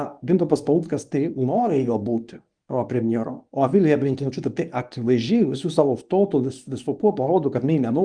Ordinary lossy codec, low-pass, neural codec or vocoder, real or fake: Opus, 32 kbps; 9.9 kHz; codec, 24 kHz, 0.9 kbps, WavTokenizer, medium speech release version 1; fake